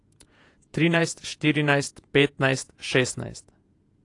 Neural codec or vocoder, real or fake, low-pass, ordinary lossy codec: vocoder, 48 kHz, 128 mel bands, Vocos; fake; 10.8 kHz; AAC, 48 kbps